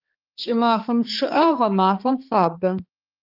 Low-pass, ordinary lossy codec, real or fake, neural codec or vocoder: 5.4 kHz; Opus, 24 kbps; fake; codec, 16 kHz, 2 kbps, X-Codec, HuBERT features, trained on balanced general audio